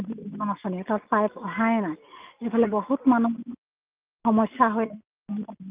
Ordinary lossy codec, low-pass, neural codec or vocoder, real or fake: Opus, 32 kbps; 3.6 kHz; none; real